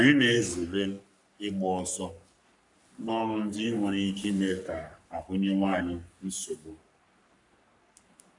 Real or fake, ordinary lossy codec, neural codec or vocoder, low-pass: fake; none; codec, 44.1 kHz, 3.4 kbps, Pupu-Codec; 10.8 kHz